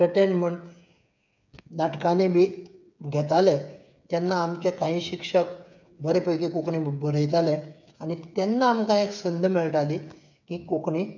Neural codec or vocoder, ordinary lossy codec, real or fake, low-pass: codec, 16 kHz, 8 kbps, FreqCodec, smaller model; none; fake; 7.2 kHz